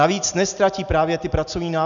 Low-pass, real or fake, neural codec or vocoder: 7.2 kHz; real; none